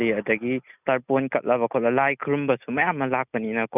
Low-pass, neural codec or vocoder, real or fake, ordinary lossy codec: 3.6 kHz; none; real; none